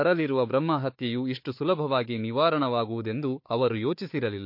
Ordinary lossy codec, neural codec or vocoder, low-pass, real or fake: MP3, 32 kbps; codec, 16 kHz, 4 kbps, FunCodec, trained on Chinese and English, 50 frames a second; 5.4 kHz; fake